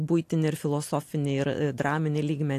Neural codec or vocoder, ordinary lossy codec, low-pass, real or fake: none; AAC, 64 kbps; 14.4 kHz; real